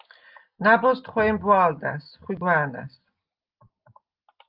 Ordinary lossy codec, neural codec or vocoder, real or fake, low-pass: Opus, 32 kbps; none; real; 5.4 kHz